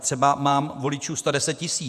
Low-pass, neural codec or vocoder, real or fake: 14.4 kHz; vocoder, 48 kHz, 128 mel bands, Vocos; fake